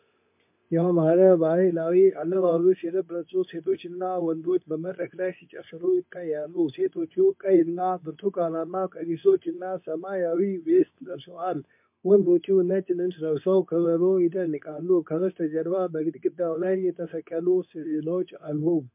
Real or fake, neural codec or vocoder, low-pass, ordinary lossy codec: fake; codec, 24 kHz, 0.9 kbps, WavTokenizer, medium speech release version 2; 3.6 kHz; MP3, 32 kbps